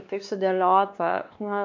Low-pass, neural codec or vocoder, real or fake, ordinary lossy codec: 7.2 kHz; codec, 16 kHz, 2 kbps, X-Codec, WavLM features, trained on Multilingual LibriSpeech; fake; MP3, 64 kbps